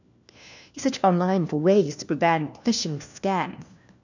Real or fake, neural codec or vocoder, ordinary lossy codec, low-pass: fake; codec, 16 kHz, 1 kbps, FunCodec, trained on LibriTTS, 50 frames a second; none; 7.2 kHz